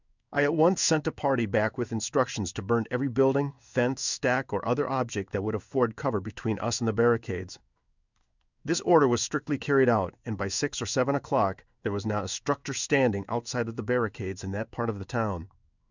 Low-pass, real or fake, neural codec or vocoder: 7.2 kHz; fake; codec, 16 kHz in and 24 kHz out, 1 kbps, XY-Tokenizer